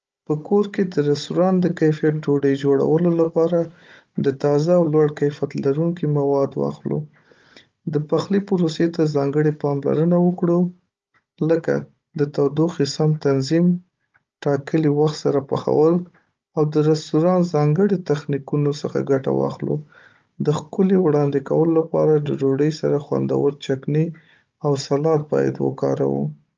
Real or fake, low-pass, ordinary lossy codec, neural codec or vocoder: fake; 7.2 kHz; Opus, 24 kbps; codec, 16 kHz, 16 kbps, FunCodec, trained on Chinese and English, 50 frames a second